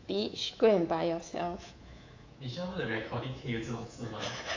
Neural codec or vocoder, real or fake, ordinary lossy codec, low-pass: codec, 24 kHz, 3.1 kbps, DualCodec; fake; AAC, 48 kbps; 7.2 kHz